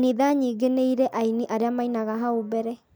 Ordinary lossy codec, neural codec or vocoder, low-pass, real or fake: none; none; none; real